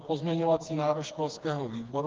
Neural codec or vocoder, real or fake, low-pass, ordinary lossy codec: codec, 16 kHz, 2 kbps, FreqCodec, smaller model; fake; 7.2 kHz; Opus, 32 kbps